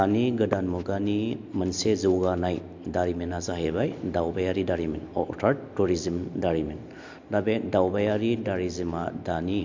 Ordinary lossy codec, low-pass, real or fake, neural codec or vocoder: MP3, 48 kbps; 7.2 kHz; real; none